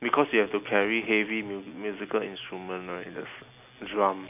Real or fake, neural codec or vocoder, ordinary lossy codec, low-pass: real; none; none; 3.6 kHz